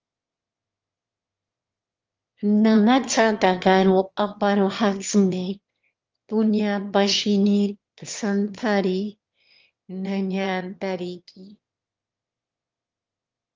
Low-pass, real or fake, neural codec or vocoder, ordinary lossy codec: 7.2 kHz; fake; autoencoder, 22.05 kHz, a latent of 192 numbers a frame, VITS, trained on one speaker; Opus, 24 kbps